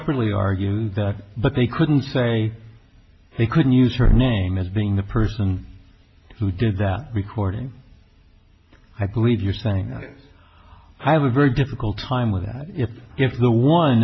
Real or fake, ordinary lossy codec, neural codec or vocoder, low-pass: real; MP3, 24 kbps; none; 7.2 kHz